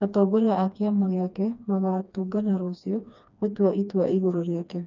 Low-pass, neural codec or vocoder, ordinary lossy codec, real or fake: 7.2 kHz; codec, 16 kHz, 2 kbps, FreqCodec, smaller model; none; fake